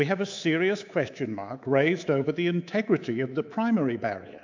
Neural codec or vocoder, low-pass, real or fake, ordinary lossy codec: codec, 24 kHz, 3.1 kbps, DualCodec; 7.2 kHz; fake; AAC, 48 kbps